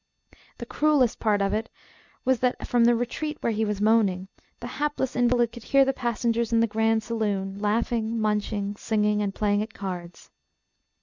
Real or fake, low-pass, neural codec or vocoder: real; 7.2 kHz; none